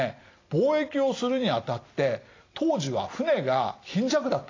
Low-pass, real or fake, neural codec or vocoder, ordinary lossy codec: 7.2 kHz; real; none; AAC, 32 kbps